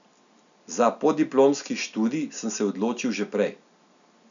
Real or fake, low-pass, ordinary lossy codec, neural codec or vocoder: real; 7.2 kHz; none; none